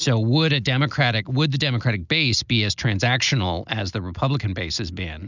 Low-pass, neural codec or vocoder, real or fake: 7.2 kHz; none; real